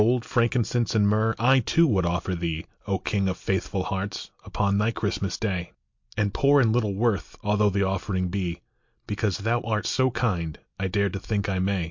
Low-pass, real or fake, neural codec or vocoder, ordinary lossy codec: 7.2 kHz; real; none; MP3, 48 kbps